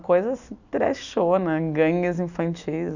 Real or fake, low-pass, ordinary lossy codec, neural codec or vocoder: real; 7.2 kHz; none; none